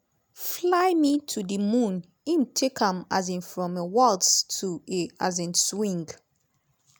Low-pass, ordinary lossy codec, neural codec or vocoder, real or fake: none; none; none; real